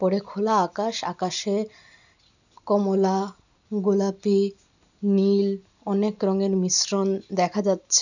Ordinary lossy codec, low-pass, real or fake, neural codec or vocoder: none; 7.2 kHz; real; none